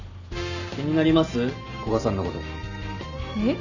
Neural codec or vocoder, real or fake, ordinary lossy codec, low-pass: none; real; none; 7.2 kHz